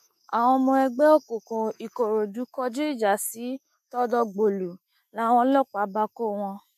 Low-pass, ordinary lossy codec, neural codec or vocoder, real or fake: 14.4 kHz; MP3, 64 kbps; autoencoder, 48 kHz, 128 numbers a frame, DAC-VAE, trained on Japanese speech; fake